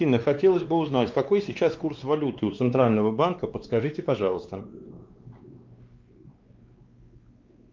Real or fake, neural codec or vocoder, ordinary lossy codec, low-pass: fake; codec, 16 kHz, 2 kbps, X-Codec, WavLM features, trained on Multilingual LibriSpeech; Opus, 32 kbps; 7.2 kHz